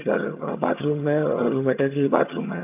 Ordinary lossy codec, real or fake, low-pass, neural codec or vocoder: AAC, 32 kbps; fake; 3.6 kHz; vocoder, 22.05 kHz, 80 mel bands, HiFi-GAN